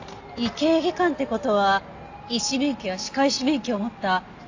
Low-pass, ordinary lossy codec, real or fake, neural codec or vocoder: 7.2 kHz; AAC, 48 kbps; real; none